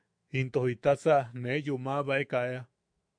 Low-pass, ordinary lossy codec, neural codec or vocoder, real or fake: 9.9 kHz; MP3, 64 kbps; autoencoder, 48 kHz, 128 numbers a frame, DAC-VAE, trained on Japanese speech; fake